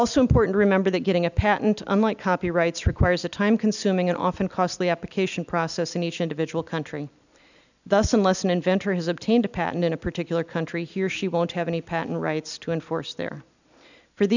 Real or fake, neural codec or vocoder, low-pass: real; none; 7.2 kHz